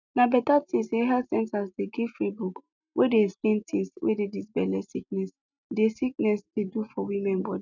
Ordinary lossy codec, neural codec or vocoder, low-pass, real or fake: none; none; 7.2 kHz; real